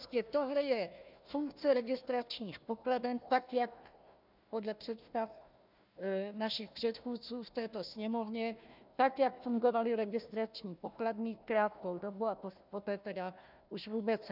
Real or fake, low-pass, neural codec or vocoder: fake; 5.4 kHz; codec, 16 kHz, 1 kbps, FunCodec, trained on Chinese and English, 50 frames a second